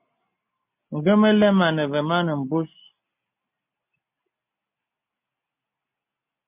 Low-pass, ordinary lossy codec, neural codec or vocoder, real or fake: 3.6 kHz; MP3, 32 kbps; none; real